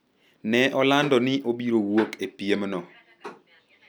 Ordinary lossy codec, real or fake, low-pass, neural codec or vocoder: none; real; none; none